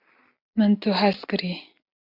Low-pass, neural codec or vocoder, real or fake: 5.4 kHz; none; real